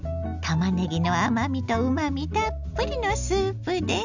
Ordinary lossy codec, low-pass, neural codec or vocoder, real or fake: none; 7.2 kHz; none; real